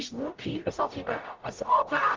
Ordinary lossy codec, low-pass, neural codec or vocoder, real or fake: Opus, 32 kbps; 7.2 kHz; codec, 44.1 kHz, 0.9 kbps, DAC; fake